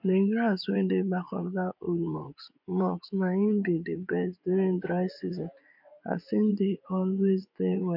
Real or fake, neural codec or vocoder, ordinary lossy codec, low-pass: fake; vocoder, 24 kHz, 100 mel bands, Vocos; MP3, 48 kbps; 5.4 kHz